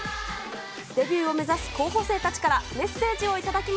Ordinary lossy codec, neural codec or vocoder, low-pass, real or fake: none; none; none; real